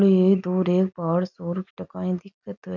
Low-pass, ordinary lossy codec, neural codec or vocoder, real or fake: 7.2 kHz; none; none; real